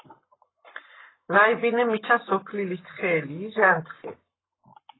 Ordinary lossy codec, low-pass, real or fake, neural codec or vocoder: AAC, 16 kbps; 7.2 kHz; fake; codec, 44.1 kHz, 7.8 kbps, Pupu-Codec